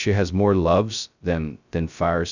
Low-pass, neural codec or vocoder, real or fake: 7.2 kHz; codec, 16 kHz, 0.2 kbps, FocalCodec; fake